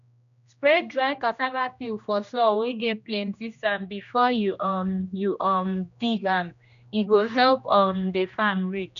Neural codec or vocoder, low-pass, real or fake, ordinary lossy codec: codec, 16 kHz, 1 kbps, X-Codec, HuBERT features, trained on general audio; 7.2 kHz; fake; none